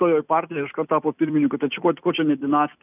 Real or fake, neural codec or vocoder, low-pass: fake; vocoder, 44.1 kHz, 128 mel bands every 256 samples, BigVGAN v2; 3.6 kHz